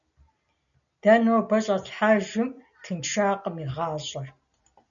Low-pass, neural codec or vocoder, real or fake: 7.2 kHz; none; real